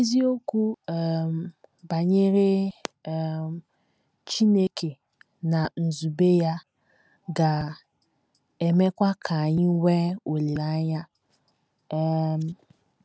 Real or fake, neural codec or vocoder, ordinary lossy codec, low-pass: real; none; none; none